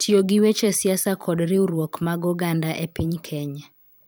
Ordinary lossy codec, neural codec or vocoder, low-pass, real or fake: none; vocoder, 44.1 kHz, 128 mel bands every 512 samples, BigVGAN v2; none; fake